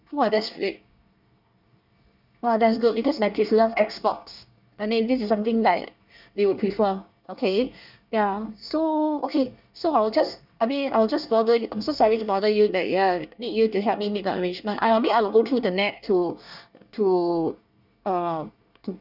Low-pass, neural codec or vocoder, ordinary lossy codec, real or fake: 5.4 kHz; codec, 24 kHz, 1 kbps, SNAC; none; fake